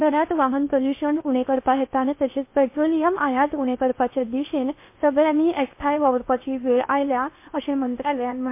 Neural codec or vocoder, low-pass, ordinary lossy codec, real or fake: codec, 16 kHz in and 24 kHz out, 0.8 kbps, FocalCodec, streaming, 65536 codes; 3.6 kHz; MP3, 24 kbps; fake